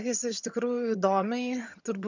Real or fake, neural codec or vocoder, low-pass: fake; vocoder, 22.05 kHz, 80 mel bands, HiFi-GAN; 7.2 kHz